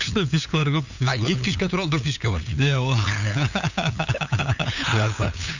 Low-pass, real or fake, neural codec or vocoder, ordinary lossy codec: 7.2 kHz; fake; codec, 16 kHz, 8 kbps, FunCodec, trained on LibriTTS, 25 frames a second; none